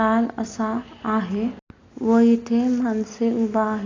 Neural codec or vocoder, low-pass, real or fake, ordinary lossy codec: none; 7.2 kHz; real; AAC, 32 kbps